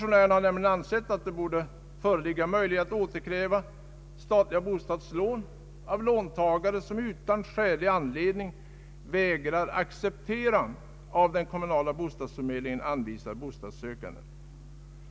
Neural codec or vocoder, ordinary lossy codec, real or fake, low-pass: none; none; real; none